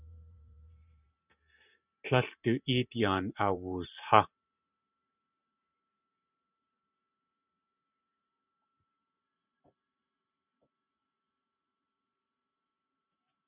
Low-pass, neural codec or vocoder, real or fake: 3.6 kHz; vocoder, 44.1 kHz, 128 mel bands every 512 samples, BigVGAN v2; fake